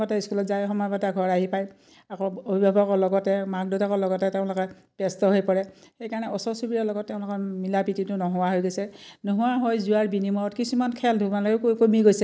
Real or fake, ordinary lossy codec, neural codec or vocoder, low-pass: real; none; none; none